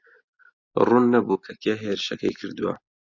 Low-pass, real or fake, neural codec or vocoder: 7.2 kHz; real; none